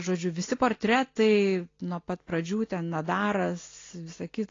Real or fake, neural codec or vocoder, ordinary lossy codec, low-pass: real; none; AAC, 32 kbps; 7.2 kHz